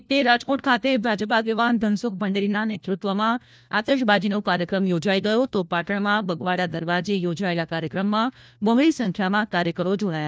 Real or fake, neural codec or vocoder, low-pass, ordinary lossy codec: fake; codec, 16 kHz, 1 kbps, FunCodec, trained on LibriTTS, 50 frames a second; none; none